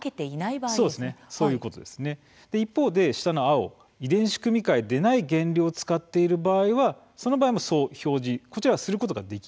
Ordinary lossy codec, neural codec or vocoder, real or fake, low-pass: none; none; real; none